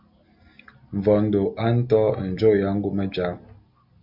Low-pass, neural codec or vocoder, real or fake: 5.4 kHz; none; real